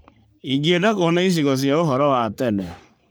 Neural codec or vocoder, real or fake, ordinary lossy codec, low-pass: codec, 44.1 kHz, 3.4 kbps, Pupu-Codec; fake; none; none